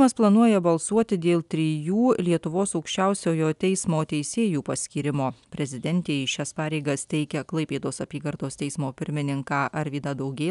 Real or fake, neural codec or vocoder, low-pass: real; none; 10.8 kHz